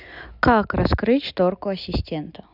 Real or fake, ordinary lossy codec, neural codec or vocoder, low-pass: real; none; none; 5.4 kHz